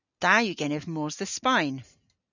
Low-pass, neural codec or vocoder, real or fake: 7.2 kHz; none; real